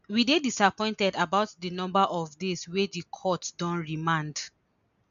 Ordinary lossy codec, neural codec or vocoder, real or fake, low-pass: none; none; real; 7.2 kHz